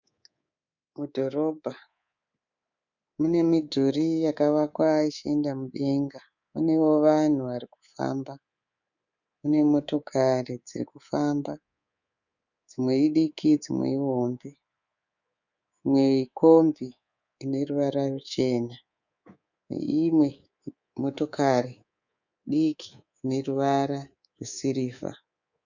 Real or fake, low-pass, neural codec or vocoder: fake; 7.2 kHz; codec, 24 kHz, 3.1 kbps, DualCodec